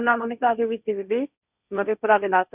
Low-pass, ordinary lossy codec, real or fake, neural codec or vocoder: 3.6 kHz; none; fake; codec, 16 kHz, 1.1 kbps, Voila-Tokenizer